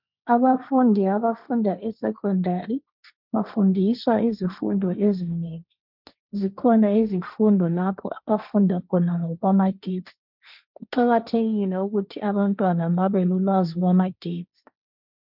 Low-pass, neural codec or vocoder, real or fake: 5.4 kHz; codec, 16 kHz, 1.1 kbps, Voila-Tokenizer; fake